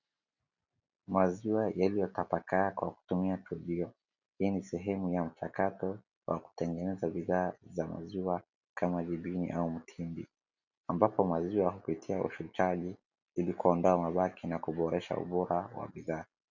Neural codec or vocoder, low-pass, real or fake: none; 7.2 kHz; real